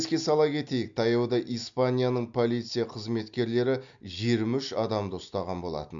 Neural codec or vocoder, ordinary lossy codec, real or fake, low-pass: none; MP3, 64 kbps; real; 7.2 kHz